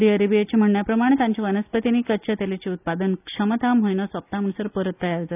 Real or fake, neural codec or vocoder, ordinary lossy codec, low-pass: real; none; none; 3.6 kHz